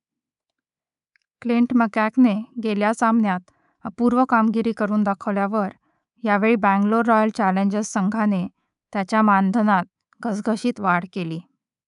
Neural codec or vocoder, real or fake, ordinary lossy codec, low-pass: codec, 24 kHz, 3.1 kbps, DualCodec; fake; none; 10.8 kHz